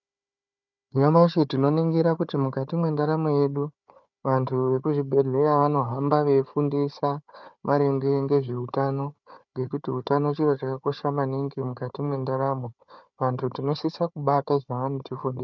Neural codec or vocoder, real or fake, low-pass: codec, 16 kHz, 4 kbps, FunCodec, trained on Chinese and English, 50 frames a second; fake; 7.2 kHz